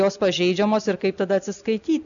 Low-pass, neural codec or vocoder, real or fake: 7.2 kHz; none; real